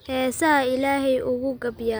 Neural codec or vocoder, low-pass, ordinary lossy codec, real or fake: none; none; none; real